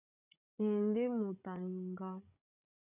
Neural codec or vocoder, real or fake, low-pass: codec, 16 kHz, 16 kbps, FreqCodec, larger model; fake; 3.6 kHz